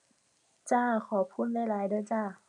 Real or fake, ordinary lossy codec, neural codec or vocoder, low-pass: real; none; none; 10.8 kHz